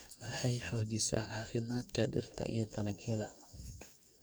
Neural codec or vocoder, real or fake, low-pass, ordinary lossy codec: codec, 44.1 kHz, 2.6 kbps, DAC; fake; none; none